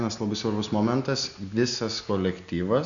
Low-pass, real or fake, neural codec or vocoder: 7.2 kHz; real; none